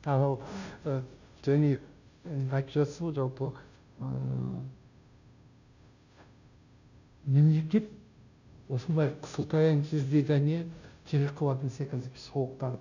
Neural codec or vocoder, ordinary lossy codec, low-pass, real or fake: codec, 16 kHz, 0.5 kbps, FunCodec, trained on Chinese and English, 25 frames a second; none; 7.2 kHz; fake